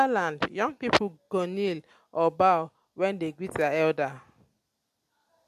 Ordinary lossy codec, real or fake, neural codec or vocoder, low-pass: MP3, 64 kbps; real; none; 14.4 kHz